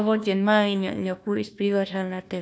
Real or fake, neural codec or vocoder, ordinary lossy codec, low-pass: fake; codec, 16 kHz, 1 kbps, FunCodec, trained on Chinese and English, 50 frames a second; none; none